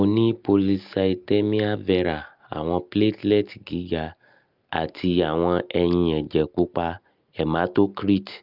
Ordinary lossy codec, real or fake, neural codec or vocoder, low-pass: Opus, 24 kbps; real; none; 5.4 kHz